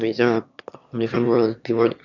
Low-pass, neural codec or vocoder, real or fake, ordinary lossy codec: 7.2 kHz; autoencoder, 22.05 kHz, a latent of 192 numbers a frame, VITS, trained on one speaker; fake; none